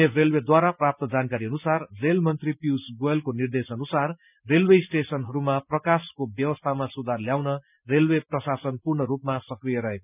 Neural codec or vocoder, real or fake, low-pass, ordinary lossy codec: none; real; 3.6 kHz; none